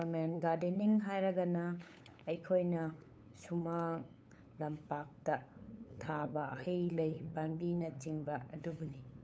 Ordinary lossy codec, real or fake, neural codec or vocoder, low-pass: none; fake; codec, 16 kHz, 8 kbps, FunCodec, trained on LibriTTS, 25 frames a second; none